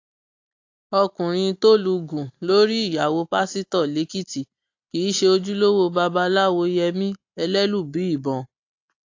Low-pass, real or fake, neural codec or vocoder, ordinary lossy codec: 7.2 kHz; real; none; AAC, 48 kbps